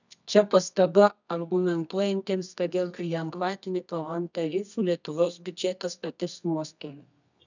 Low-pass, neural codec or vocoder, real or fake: 7.2 kHz; codec, 24 kHz, 0.9 kbps, WavTokenizer, medium music audio release; fake